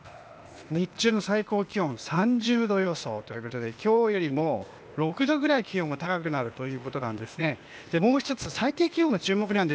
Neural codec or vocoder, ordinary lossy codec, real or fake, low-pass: codec, 16 kHz, 0.8 kbps, ZipCodec; none; fake; none